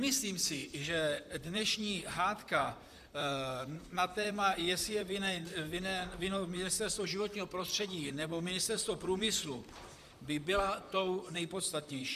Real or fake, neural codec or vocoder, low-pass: fake; vocoder, 44.1 kHz, 128 mel bands, Pupu-Vocoder; 14.4 kHz